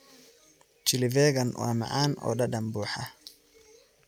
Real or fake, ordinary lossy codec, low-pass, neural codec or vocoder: real; none; 19.8 kHz; none